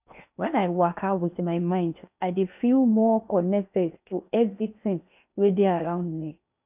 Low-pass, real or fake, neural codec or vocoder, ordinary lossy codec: 3.6 kHz; fake; codec, 16 kHz in and 24 kHz out, 0.8 kbps, FocalCodec, streaming, 65536 codes; none